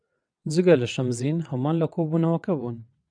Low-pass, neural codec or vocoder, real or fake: 9.9 kHz; vocoder, 22.05 kHz, 80 mel bands, WaveNeXt; fake